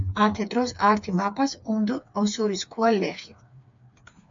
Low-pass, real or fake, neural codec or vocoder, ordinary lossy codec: 7.2 kHz; fake; codec, 16 kHz, 4 kbps, FreqCodec, smaller model; MP3, 64 kbps